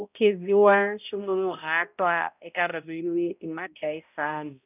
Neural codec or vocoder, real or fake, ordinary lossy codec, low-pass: codec, 16 kHz, 0.5 kbps, X-Codec, HuBERT features, trained on balanced general audio; fake; none; 3.6 kHz